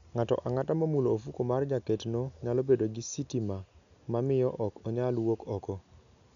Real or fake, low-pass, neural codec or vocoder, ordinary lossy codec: real; 7.2 kHz; none; none